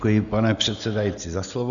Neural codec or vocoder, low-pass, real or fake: none; 7.2 kHz; real